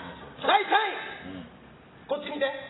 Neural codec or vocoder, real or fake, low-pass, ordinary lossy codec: none; real; 7.2 kHz; AAC, 16 kbps